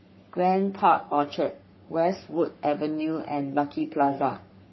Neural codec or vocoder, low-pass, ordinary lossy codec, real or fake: codec, 44.1 kHz, 3.4 kbps, Pupu-Codec; 7.2 kHz; MP3, 24 kbps; fake